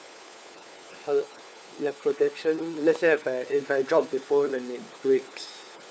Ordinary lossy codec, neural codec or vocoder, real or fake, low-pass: none; codec, 16 kHz, 4 kbps, FunCodec, trained on LibriTTS, 50 frames a second; fake; none